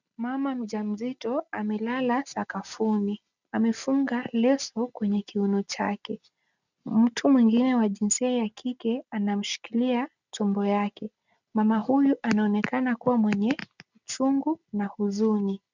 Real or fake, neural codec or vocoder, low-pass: real; none; 7.2 kHz